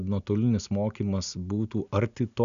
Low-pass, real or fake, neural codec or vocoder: 7.2 kHz; real; none